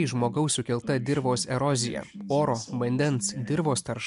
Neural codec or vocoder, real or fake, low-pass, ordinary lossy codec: none; real; 10.8 kHz; MP3, 64 kbps